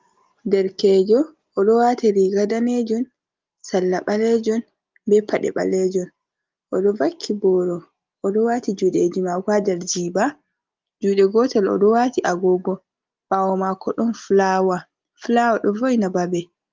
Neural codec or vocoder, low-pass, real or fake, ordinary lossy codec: none; 7.2 kHz; real; Opus, 32 kbps